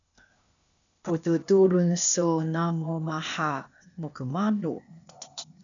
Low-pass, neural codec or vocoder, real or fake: 7.2 kHz; codec, 16 kHz, 0.8 kbps, ZipCodec; fake